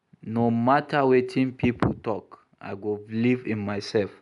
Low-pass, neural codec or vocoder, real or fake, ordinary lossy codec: 10.8 kHz; none; real; none